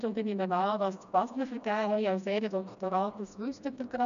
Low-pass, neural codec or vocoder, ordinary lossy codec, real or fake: 7.2 kHz; codec, 16 kHz, 1 kbps, FreqCodec, smaller model; none; fake